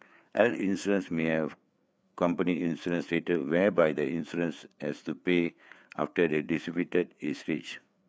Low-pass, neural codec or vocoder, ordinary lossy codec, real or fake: none; codec, 16 kHz, 4 kbps, FreqCodec, larger model; none; fake